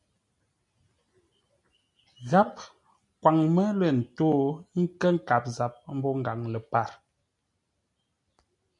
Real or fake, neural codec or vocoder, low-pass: real; none; 10.8 kHz